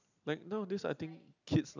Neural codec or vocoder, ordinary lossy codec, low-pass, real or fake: none; none; 7.2 kHz; real